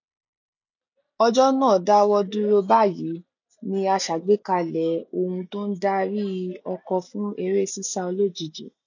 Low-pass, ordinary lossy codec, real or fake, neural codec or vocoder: 7.2 kHz; none; real; none